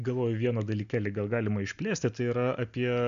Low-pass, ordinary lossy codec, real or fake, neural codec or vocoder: 7.2 kHz; MP3, 64 kbps; real; none